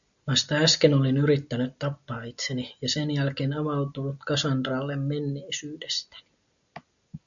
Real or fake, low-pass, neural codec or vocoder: real; 7.2 kHz; none